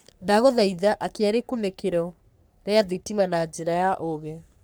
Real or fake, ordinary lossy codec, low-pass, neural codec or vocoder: fake; none; none; codec, 44.1 kHz, 3.4 kbps, Pupu-Codec